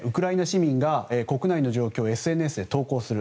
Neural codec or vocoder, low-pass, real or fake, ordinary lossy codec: none; none; real; none